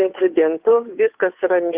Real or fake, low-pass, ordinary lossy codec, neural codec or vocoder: fake; 3.6 kHz; Opus, 32 kbps; codec, 16 kHz, 2 kbps, FunCodec, trained on Chinese and English, 25 frames a second